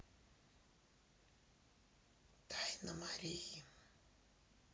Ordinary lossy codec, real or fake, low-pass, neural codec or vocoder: none; real; none; none